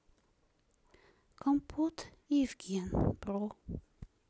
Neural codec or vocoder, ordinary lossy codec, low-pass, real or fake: none; none; none; real